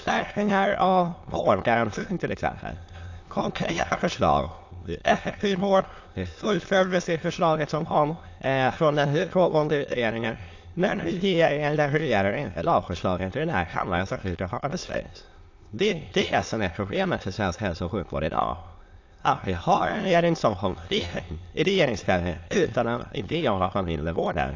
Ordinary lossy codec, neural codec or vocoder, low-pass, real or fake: AAC, 48 kbps; autoencoder, 22.05 kHz, a latent of 192 numbers a frame, VITS, trained on many speakers; 7.2 kHz; fake